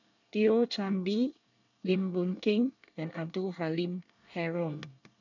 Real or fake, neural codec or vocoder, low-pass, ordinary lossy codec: fake; codec, 24 kHz, 1 kbps, SNAC; 7.2 kHz; none